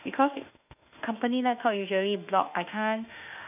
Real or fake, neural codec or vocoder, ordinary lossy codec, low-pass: fake; autoencoder, 48 kHz, 32 numbers a frame, DAC-VAE, trained on Japanese speech; none; 3.6 kHz